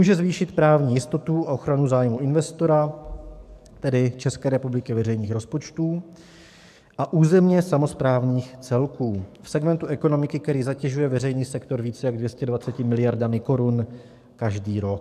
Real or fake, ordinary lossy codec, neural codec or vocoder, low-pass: fake; MP3, 96 kbps; codec, 44.1 kHz, 7.8 kbps, DAC; 14.4 kHz